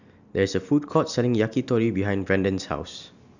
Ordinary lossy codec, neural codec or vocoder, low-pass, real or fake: none; none; 7.2 kHz; real